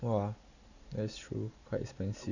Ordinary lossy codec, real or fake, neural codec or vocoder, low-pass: none; real; none; 7.2 kHz